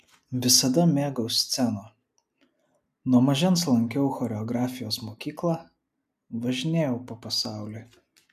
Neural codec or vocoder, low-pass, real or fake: none; 14.4 kHz; real